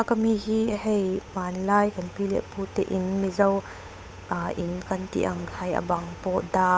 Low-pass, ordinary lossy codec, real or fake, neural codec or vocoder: none; none; fake; codec, 16 kHz, 8 kbps, FunCodec, trained on Chinese and English, 25 frames a second